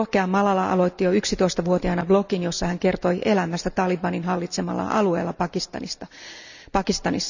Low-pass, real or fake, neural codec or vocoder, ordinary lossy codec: 7.2 kHz; real; none; none